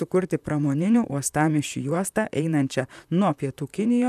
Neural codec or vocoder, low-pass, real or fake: vocoder, 44.1 kHz, 128 mel bands, Pupu-Vocoder; 14.4 kHz; fake